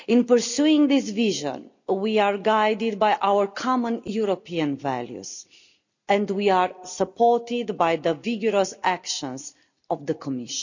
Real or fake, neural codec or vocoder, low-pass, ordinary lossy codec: real; none; 7.2 kHz; none